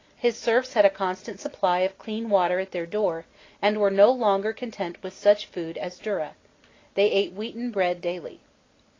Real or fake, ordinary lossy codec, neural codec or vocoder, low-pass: real; AAC, 32 kbps; none; 7.2 kHz